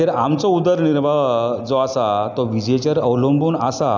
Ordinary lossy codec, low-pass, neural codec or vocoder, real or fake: none; 7.2 kHz; none; real